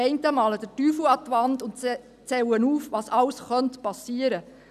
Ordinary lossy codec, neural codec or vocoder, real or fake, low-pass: none; none; real; 14.4 kHz